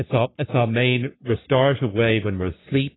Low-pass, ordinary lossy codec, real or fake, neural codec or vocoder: 7.2 kHz; AAC, 16 kbps; fake; codec, 44.1 kHz, 3.4 kbps, Pupu-Codec